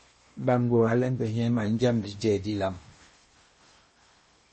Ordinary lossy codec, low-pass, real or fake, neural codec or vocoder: MP3, 32 kbps; 10.8 kHz; fake; codec, 16 kHz in and 24 kHz out, 0.8 kbps, FocalCodec, streaming, 65536 codes